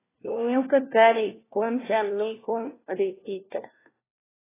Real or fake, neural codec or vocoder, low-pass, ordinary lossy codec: fake; codec, 16 kHz, 1 kbps, FunCodec, trained on LibriTTS, 50 frames a second; 3.6 kHz; AAC, 16 kbps